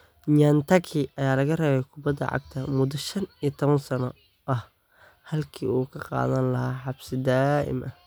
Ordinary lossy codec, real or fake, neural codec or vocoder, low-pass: none; real; none; none